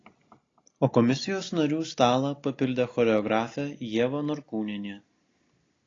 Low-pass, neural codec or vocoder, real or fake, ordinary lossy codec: 7.2 kHz; none; real; AAC, 32 kbps